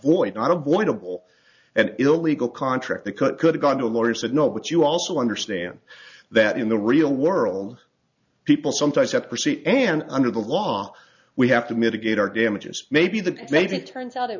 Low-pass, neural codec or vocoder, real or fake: 7.2 kHz; none; real